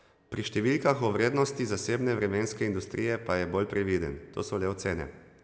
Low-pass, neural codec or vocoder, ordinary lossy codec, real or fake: none; none; none; real